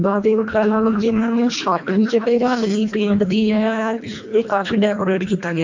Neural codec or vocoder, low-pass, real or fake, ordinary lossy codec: codec, 24 kHz, 1.5 kbps, HILCodec; 7.2 kHz; fake; MP3, 48 kbps